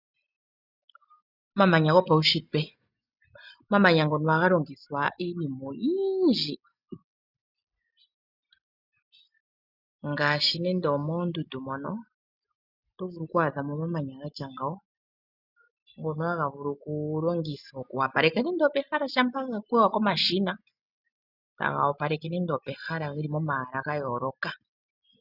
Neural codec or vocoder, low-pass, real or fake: none; 5.4 kHz; real